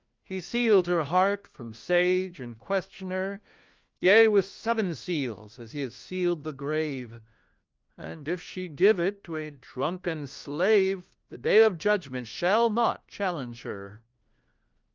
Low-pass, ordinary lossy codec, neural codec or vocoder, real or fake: 7.2 kHz; Opus, 24 kbps; codec, 16 kHz, 1 kbps, FunCodec, trained on LibriTTS, 50 frames a second; fake